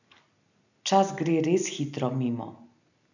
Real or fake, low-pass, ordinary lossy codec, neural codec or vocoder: real; 7.2 kHz; none; none